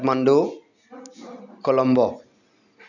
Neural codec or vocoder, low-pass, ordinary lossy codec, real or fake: none; 7.2 kHz; none; real